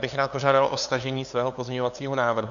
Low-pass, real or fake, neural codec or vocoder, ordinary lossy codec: 7.2 kHz; fake; codec, 16 kHz, 2 kbps, FunCodec, trained on LibriTTS, 25 frames a second; MP3, 96 kbps